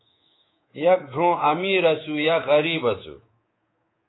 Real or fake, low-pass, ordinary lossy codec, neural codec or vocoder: fake; 7.2 kHz; AAC, 16 kbps; codec, 24 kHz, 3.1 kbps, DualCodec